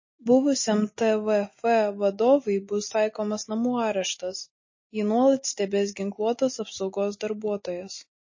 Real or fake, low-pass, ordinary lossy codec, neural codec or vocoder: real; 7.2 kHz; MP3, 32 kbps; none